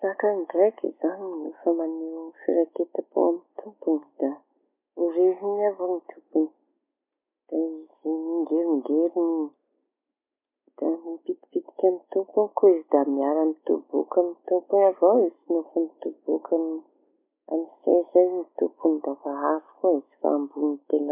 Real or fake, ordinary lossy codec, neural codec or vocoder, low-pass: real; MP3, 32 kbps; none; 3.6 kHz